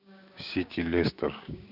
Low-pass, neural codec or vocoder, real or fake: 5.4 kHz; codec, 16 kHz, 6 kbps, DAC; fake